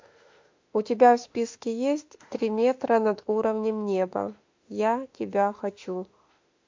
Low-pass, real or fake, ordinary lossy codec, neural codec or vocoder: 7.2 kHz; fake; MP3, 48 kbps; autoencoder, 48 kHz, 32 numbers a frame, DAC-VAE, trained on Japanese speech